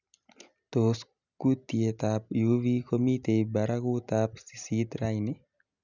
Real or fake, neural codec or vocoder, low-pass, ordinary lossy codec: real; none; 7.2 kHz; none